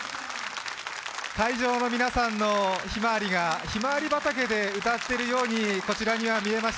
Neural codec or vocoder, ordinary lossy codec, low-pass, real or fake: none; none; none; real